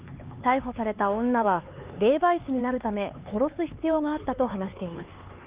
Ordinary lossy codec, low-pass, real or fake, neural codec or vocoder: Opus, 32 kbps; 3.6 kHz; fake; codec, 16 kHz, 4 kbps, X-Codec, HuBERT features, trained on LibriSpeech